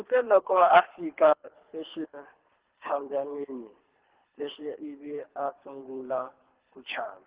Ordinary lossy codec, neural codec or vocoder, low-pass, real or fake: Opus, 16 kbps; codec, 24 kHz, 3 kbps, HILCodec; 3.6 kHz; fake